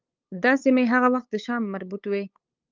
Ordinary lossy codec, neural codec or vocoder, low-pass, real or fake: Opus, 24 kbps; codec, 16 kHz, 8 kbps, FunCodec, trained on LibriTTS, 25 frames a second; 7.2 kHz; fake